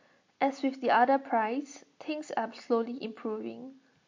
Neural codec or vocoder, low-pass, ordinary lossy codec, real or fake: none; 7.2 kHz; MP3, 48 kbps; real